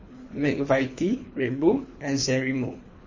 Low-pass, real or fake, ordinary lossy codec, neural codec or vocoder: 7.2 kHz; fake; MP3, 32 kbps; codec, 24 kHz, 3 kbps, HILCodec